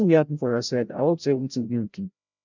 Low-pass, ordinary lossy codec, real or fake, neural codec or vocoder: 7.2 kHz; none; fake; codec, 16 kHz, 0.5 kbps, FreqCodec, larger model